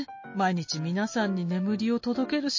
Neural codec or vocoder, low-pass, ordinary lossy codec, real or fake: none; 7.2 kHz; MP3, 32 kbps; real